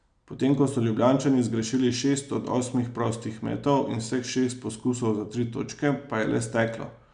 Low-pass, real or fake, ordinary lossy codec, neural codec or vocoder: 9.9 kHz; real; none; none